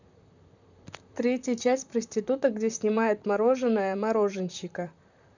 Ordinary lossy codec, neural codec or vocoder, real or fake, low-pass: none; vocoder, 44.1 kHz, 128 mel bands, Pupu-Vocoder; fake; 7.2 kHz